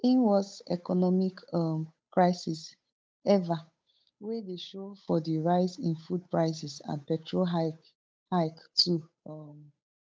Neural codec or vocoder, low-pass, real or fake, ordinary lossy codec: codec, 16 kHz, 8 kbps, FunCodec, trained on Chinese and English, 25 frames a second; none; fake; none